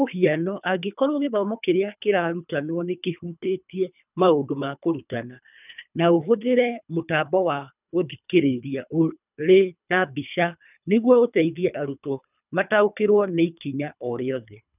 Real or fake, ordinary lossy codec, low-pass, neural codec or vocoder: fake; none; 3.6 kHz; codec, 24 kHz, 3 kbps, HILCodec